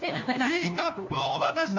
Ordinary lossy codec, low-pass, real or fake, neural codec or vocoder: MP3, 48 kbps; 7.2 kHz; fake; codec, 16 kHz, 1 kbps, FunCodec, trained on LibriTTS, 50 frames a second